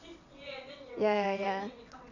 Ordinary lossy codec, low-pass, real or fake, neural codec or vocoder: none; 7.2 kHz; fake; vocoder, 22.05 kHz, 80 mel bands, WaveNeXt